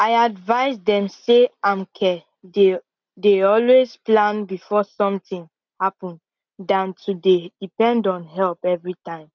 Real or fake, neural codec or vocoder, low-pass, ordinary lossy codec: real; none; 7.2 kHz; none